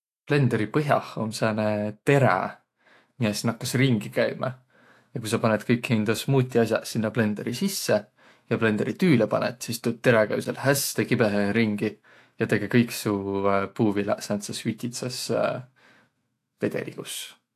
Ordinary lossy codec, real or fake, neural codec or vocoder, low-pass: AAC, 64 kbps; fake; autoencoder, 48 kHz, 128 numbers a frame, DAC-VAE, trained on Japanese speech; 14.4 kHz